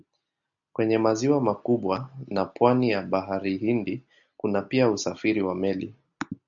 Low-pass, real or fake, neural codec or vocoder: 7.2 kHz; real; none